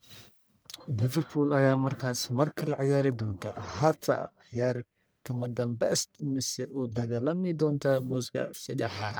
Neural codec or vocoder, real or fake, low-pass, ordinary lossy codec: codec, 44.1 kHz, 1.7 kbps, Pupu-Codec; fake; none; none